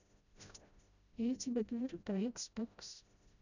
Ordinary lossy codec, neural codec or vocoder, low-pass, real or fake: none; codec, 16 kHz, 0.5 kbps, FreqCodec, smaller model; 7.2 kHz; fake